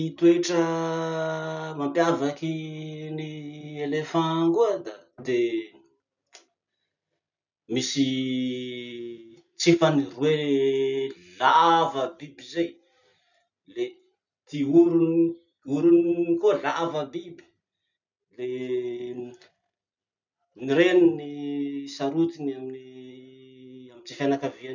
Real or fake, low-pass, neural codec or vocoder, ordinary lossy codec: real; 7.2 kHz; none; none